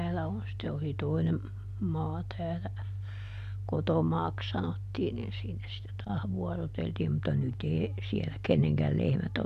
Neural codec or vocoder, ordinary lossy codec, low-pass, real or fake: none; none; 14.4 kHz; real